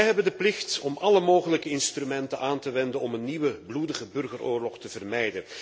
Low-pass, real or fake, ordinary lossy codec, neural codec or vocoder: none; real; none; none